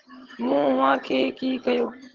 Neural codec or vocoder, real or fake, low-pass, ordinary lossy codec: vocoder, 22.05 kHz, 80 mel bands, HiFi-GAN; fake; 7.2 kHz; Opus, 16 kbps